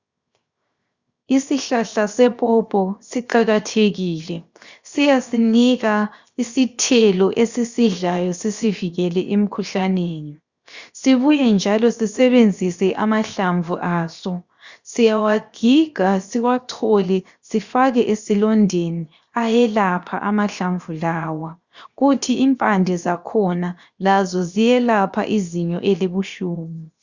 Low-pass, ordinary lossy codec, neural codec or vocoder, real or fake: 7.2 kHz; Opus, 64 kbps; codec, 16 kHz, 0.7 kbps, FocalCodec; fake